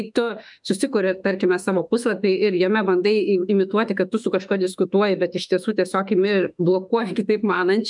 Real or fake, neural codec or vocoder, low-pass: fake; autoencoder, 48 kHz, 32 numbers a frame, DAC-VAE, trained on Japanese speech; 10.8 kHz